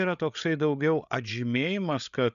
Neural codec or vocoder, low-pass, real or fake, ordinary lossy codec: codec, 16 kHz, 8 kbps, FreqCodec, larger model; 7.2 kHz; fake; MP3, 96 kbps